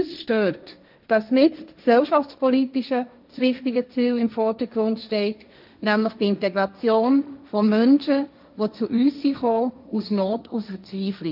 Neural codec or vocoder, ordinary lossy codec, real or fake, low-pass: codec, 16 kHz, 1.1 kbps, Voila-Tokenizer; none; fake; 5.4 kHz